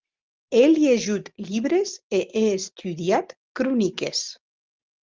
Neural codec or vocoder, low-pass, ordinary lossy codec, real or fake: none; 7.2 kHz; Opus, 24 kbps; real